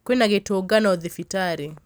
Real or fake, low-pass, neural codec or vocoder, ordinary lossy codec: real; none; none; none